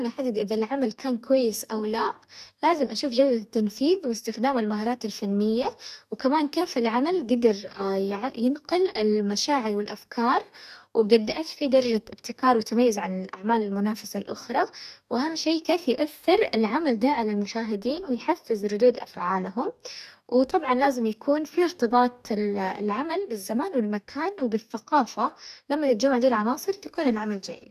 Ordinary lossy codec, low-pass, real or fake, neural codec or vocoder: none; 14.4 kHz; fake; codec, 44.1 kHz, 2.6 kbps, DAC